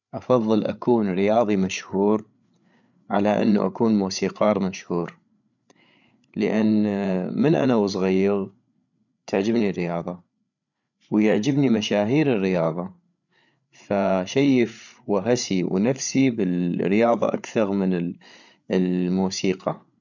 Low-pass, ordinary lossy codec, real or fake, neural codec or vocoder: 7.2 kHz; none; fake; codec, 16 kHz, 8 kbps, FreqCodec, larger model